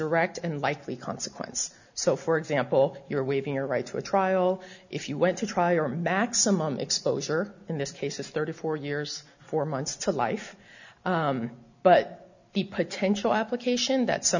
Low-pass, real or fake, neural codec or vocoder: 7.2 kHz; real; none